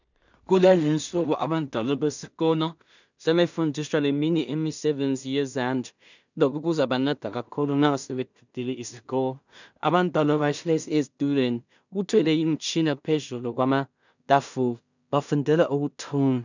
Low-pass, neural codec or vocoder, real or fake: 7.2 kHz; codec, 16 kHz in and 24 kHz out, 0.4 kbps, LongCat-Audio-Codec, two codebook decoder; fake